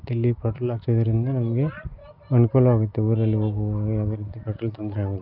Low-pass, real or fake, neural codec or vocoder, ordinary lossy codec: 5.4 kHz; real; none; Opus, 24 kbps